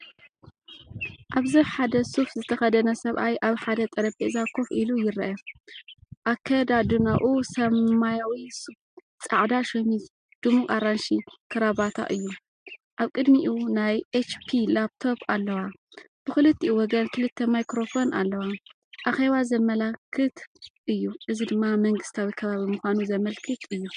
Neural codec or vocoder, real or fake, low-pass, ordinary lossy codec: none; real; 9.9 kHz; MP3, 64 kbps